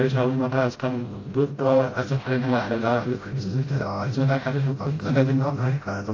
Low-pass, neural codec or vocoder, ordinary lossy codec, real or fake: 7.2 kHz; codec, 16 kHz, 0.5 kbps, FreqCodec, smaller model; AAC, 32 kbps; fake